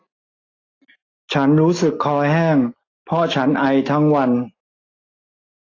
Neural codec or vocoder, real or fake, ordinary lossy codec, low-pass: none; real; AAC, 32 kbps; 7.2 kHz